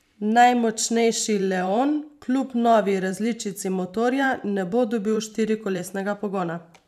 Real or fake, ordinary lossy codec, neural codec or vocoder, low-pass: fake; none; vocoder, 44.1 kHz, 128 mel bands every 512 samples, BigVGAN v2; 14.4 kHz